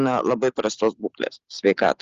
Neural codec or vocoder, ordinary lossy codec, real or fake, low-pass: none; Opus, 32 kbps; real; 7.2 kHz